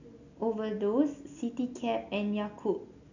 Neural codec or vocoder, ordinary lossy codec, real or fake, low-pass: none; none; real; 7.2 kHz